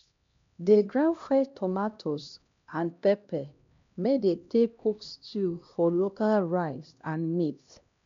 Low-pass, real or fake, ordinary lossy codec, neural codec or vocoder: 7.2 kHz; fake; MP3, 64 kbps; codec, 16 kHz, 1 kbps, X-Codec, HuBERT features, trained on LibriSpeech